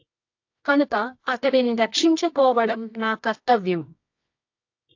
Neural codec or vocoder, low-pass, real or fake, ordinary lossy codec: codec, 24 kHz, 0.9 kbps, WavTokenizer, medium music audio release; 7.2 kHz; fake; AAC, 48 kbps